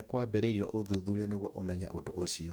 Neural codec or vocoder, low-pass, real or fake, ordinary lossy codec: codec, 44.1 kHz, 2.6 kbps, DAC; none; fake; none